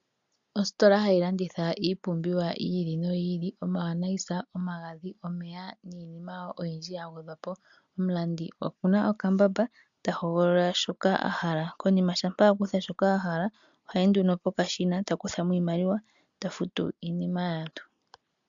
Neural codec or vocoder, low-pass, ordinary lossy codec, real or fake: none; 7.2 kHz; MP3, 64 kbps; real